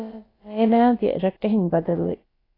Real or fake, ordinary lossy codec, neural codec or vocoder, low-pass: fake; AAC, 24 kbps; codec, 16 kHz, about 1 kbps, DyCAST, with the encoder's durations; 5.4 kHz